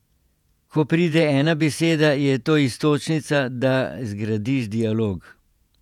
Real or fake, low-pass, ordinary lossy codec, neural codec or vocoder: fake; 19.8 kHz; none; vocoder, 48 kHz, 128 mel bands, Vocos